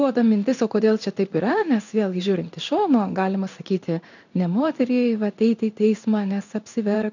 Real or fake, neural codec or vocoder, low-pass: fake; codec, 16 kHz in and 24 kHz out, 1 kbps, XY-Tokenizer; 7.2 kHz